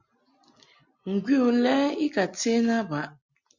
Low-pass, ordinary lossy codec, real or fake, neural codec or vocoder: 7.2 kHz; Opus, 64 kbps; real; none